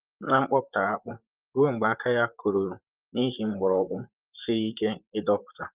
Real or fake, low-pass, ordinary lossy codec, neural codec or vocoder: fake; 3.6 kHz; Opus, 32 kbps; vocoder, 44.1 kHz, 128 mel bands, Pupu-Vocoder